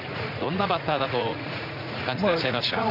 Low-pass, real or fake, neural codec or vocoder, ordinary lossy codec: 5.4 kHz; fake; vocoder, 44.1 kHz, 80 mel bands, Vocos; none